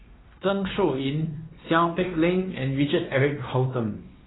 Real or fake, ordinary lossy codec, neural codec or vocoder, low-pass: fake; AAC, 16 kbps; codec, 16 kHz, 2 kbps, X-Codec, WavLM features, trained on Multilingual LibriSpeech; 7.2 kHz